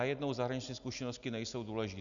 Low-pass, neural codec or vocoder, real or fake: 7.2 kHz; none; real